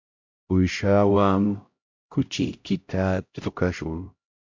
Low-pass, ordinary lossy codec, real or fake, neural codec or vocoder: 7.2 kHz; MP3, 48 kbps; fake; codec, 16 kHz, 0.5 kbps, X-Codec, HuBERT features, trained on LibriSpeech